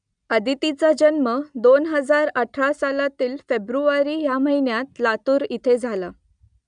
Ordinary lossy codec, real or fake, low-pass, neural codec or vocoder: none; real; 9.9 kHz; none